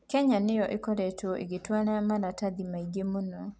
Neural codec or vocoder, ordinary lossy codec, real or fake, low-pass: none; none; real; none